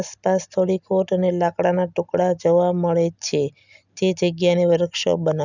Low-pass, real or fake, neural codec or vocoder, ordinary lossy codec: 7.2 kHz; real; none; none